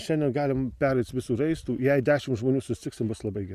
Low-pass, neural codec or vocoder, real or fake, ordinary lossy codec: 14.4 kHz; none; real; MP3, 96 kbps